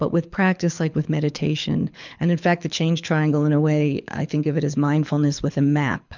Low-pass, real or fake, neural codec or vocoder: 7.2 kHz; fake; codec, 24 kHz, 6 kbps, HILCodec